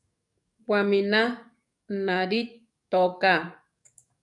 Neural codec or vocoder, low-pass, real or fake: codec, 44.1 kHz, 7.8 kbps, DAC; 10.8 kHz; fake